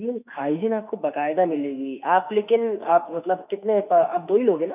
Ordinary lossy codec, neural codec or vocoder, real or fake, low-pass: none; autoencoder, 48 kHz, 32 numbers a frame, DAC-VAE, trained on Japanese speech; fake; 3.6 kHz